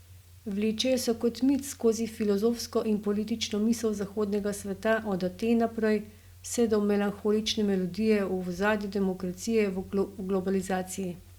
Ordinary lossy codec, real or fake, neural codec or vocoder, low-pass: Opus, 64 kbps; real; none; 19.8 kHz